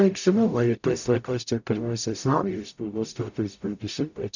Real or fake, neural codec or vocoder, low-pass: fake; codec, 44.1 kHz, 0.9 kbps, DAC; 7.2 kHz